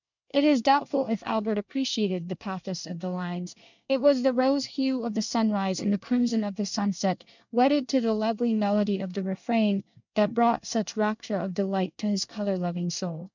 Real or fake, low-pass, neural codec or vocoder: fake; 7.2 kHz; codec, 24 kHz, 1 kbps, SNAC